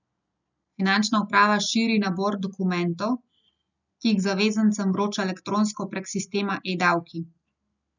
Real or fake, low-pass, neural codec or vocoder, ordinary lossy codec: real; 7.2 kHz; none; none